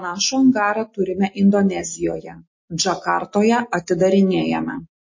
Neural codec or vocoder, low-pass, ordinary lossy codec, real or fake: none; 7.2 kHz; MP3, 32 kbps; real